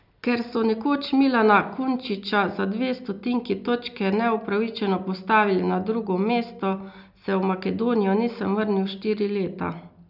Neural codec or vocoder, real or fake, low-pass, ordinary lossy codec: none; real; 5.4 kHz; none